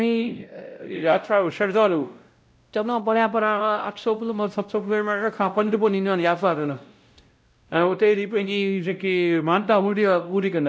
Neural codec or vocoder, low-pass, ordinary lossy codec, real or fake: codec, 16 kHz, 0.5 kbps, X-Codec, WavLM features, trained on Multilingual LibriSpeech; none; none; fake